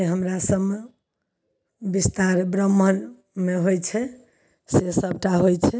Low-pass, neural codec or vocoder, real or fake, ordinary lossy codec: none; none; real; none